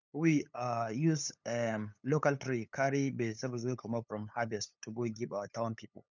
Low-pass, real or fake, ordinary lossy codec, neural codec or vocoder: 7.2 kHz; fake; none; codec, 16 kHz, 8 kbps, FunCodec, trained on LibriTTS, 25 frames a second